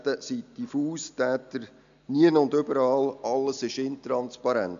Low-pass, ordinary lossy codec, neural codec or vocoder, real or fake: 7.2 kHz; AAC, 96 kbps; none; real